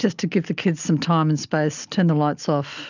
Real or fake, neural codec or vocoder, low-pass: real; none; 7.2 kHz